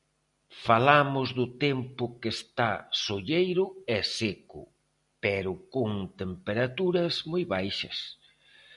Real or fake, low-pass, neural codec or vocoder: real; 10.8 kHz; none